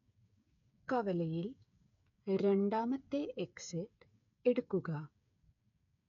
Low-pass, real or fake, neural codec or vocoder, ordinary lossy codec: 7.2 kHz; fake; codec, 16 kHz, 8 kbps, FreqCodec, smaller model; none